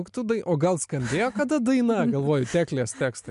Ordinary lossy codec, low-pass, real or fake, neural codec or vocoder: MP3, 64 kbps; 10.8 kHz; real; none